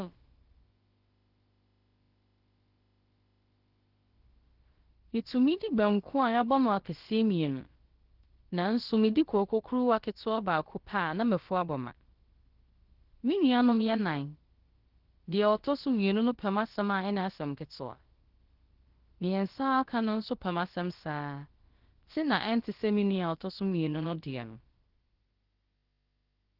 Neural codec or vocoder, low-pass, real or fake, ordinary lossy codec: codec, 16 kHz, about 1 kbps, DyCAST, with the encoder's durations; 5.4 kHz; fake; Opus, 16 kbps